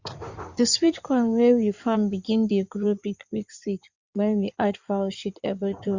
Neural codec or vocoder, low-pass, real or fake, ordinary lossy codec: codec, 16 kHz in and 24 kHz out, 2.2 kbps, FireRedTTS-2 codec; 7.2 kHz; fake; Opus, 64 kbps